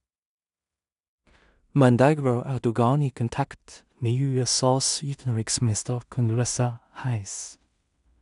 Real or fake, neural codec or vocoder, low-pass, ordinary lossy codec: fake; codec, 16 kHz in and 24 kHz out, 0.4 kbps, LongCat-Audio-Codec, two codebook decoder; 10.8 kHz; none